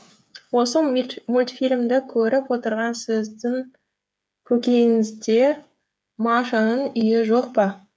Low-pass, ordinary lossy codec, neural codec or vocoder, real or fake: none; none; codec, 16 kHz, 8 kbps, FreqCodec, smaller model; fake